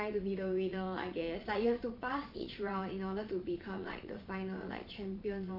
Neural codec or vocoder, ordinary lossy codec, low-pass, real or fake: vocoder, 22.05 kHz, 80 mel bands, Vocos; MP3, 32 kbps; 5.4 kHz; fake